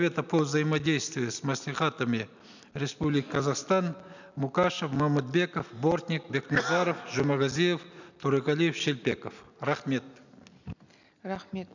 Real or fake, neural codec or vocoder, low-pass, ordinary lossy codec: real; none; 7.2 kHz; none